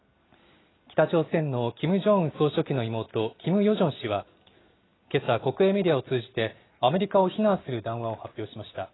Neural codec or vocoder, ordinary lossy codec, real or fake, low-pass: none; AAC, 16 kbps; real; 7.2 kHz